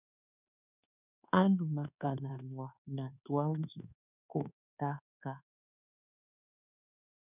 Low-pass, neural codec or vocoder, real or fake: 3.6 kHz; codec, 24 kHz, 1.2 kbps, DualCodec; fake